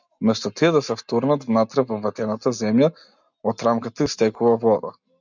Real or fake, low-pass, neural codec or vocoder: real; 7.2 kHz; none